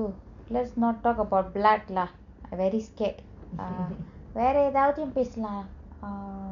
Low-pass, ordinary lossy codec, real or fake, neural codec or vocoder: 7.2 kHz; none; real; none